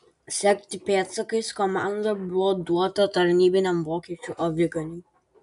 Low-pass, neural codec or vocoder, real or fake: 10.8 kHz; none; real